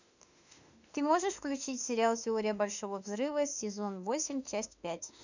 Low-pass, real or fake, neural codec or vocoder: 7.2 kHz; fake; autoencoder, 48 kHz, 32 numbers a frame, DAC-VAE, trained on Japanese speech